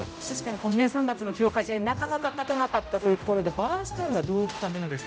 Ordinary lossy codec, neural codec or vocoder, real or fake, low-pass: none; codec, 16 kHz, 0.5 kbps, X-Codec, HuBERT features, trained on general audio; fake; none